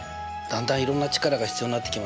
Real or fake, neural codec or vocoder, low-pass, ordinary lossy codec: real; none; none; none